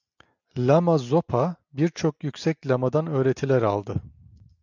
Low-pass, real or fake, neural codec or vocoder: 7.2 kHz; real; none